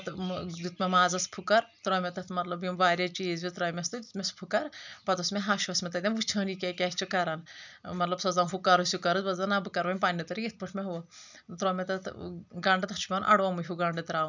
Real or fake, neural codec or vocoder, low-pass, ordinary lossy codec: real; none; 7.2 kHz; none